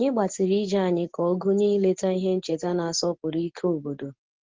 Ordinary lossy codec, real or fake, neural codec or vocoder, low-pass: Opus, 16 kbps; real; none; 7.2 kHz